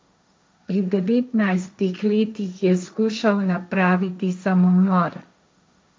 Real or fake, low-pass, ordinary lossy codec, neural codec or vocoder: fake; none; none; codec, 16 kHz, 1.1 kbps, Voila-Tokenizer